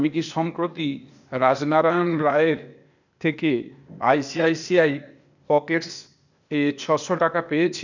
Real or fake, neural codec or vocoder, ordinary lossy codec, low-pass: fake; codec, 16 kHz, 0.8 kbps, ZipCodec; none; 7.2 kHz